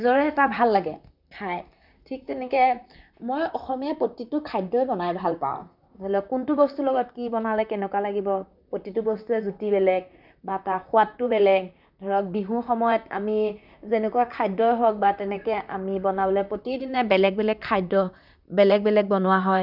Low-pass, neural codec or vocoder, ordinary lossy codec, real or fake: 5.4 kHz; vocoder, 44.1 kHz, 128 mel bands, Pupu-Vocoder; none; fake